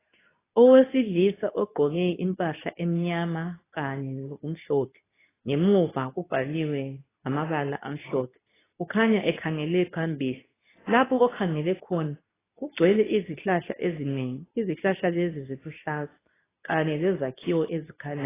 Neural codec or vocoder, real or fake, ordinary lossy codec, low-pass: codec, 24 kHz, 0.9 kbps, WavTokenizer, medium speech release version 2; fake; AAC, 16 kbps; 3.6 kHz